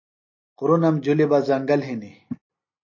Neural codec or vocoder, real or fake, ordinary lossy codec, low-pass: none; real; MP3, 32 kbps; 7.2 kHz